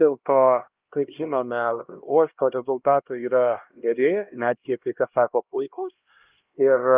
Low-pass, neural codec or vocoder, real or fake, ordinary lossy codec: 3.6 kHz; codec, 16 kHz, 1 kbps, X-Codec, HuBERT features, trained on LibriSpeech; fake; Opus, 24 kbps